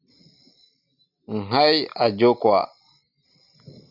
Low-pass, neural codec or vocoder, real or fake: 5.4 kHz; none; real